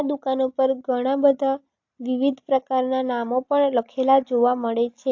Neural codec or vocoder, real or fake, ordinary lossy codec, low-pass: none; real; AAC, 48 kbps; 7.2 kHz